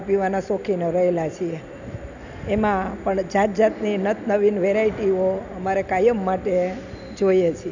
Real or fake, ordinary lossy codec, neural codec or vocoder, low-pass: real; none; none; 7.2 kHz